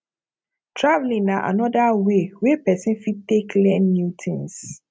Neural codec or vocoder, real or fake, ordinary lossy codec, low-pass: none; real; none; none